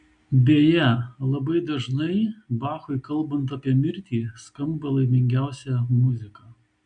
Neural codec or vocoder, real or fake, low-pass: none; real; 9.9 kHz